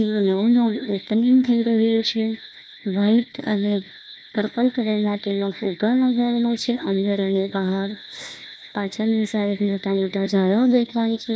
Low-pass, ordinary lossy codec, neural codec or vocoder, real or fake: none; none; codec, 16 kHz, 1 kbps, FunCodec, trained on Chinese and English, 50 frames a second; fake